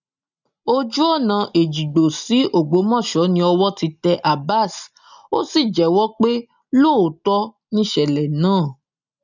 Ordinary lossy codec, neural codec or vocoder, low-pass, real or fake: none; none; 7.2 kHz; real